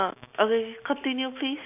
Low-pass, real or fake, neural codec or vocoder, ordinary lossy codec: 3.6 kHz; real; none; none